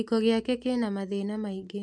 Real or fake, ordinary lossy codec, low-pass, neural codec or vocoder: real; MP3, 64 kbps; 9.9 kHz; none